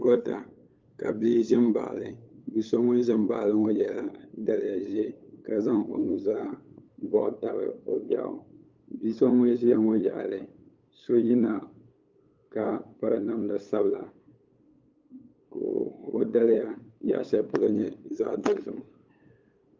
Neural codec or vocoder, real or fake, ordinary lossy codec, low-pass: codec, 16 kHz, 8 kbps, FunCodec, trained on LibriTTS, 25 frames a second; fake; Opus, 24 kbps; 7.2 kHz